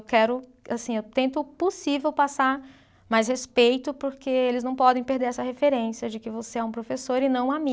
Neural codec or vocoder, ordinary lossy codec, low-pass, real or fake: none; none; none; real